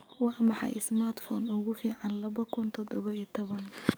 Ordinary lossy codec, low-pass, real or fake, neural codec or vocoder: none; none; fake; codec, 44.1 kHz, 7.8 kbps, DAC